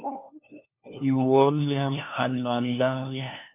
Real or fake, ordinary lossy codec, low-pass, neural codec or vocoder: fake; MP3, 32 kbps; 3.6 kHz; codec, 16 kHz, 1 kbps, FunCodec, trained on LibriTTS, 50 frames a second